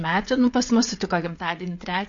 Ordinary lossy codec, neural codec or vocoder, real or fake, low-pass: MP3, 48 kbps; codec, 16 kHz, 16 kbps, FreqCodec, smaller model; fake; 7.2 kHz